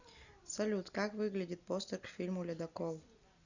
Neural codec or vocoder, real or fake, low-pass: none; real; 7.2 kHz